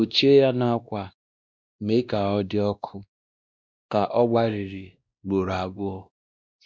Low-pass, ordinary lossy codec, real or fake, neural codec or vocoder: none; none; fake; codec, 16 kHz, 1 kbps, X-Codec, WavLM features, trained on Multilingual LibriSpeech